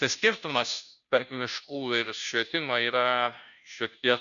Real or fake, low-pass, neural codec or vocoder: fake; 7.2 kHz; codec, 16 kHz, 0.5 kbps, FunCodec, trained on Chinese and English, 25 frames a second